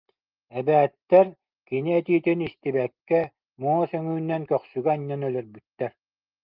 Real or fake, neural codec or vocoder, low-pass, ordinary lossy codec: real; none; 5.4 kHz; Opus, 32 kbps